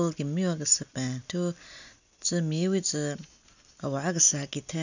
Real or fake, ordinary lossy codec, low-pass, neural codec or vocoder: real; none; 7.2 kHz; none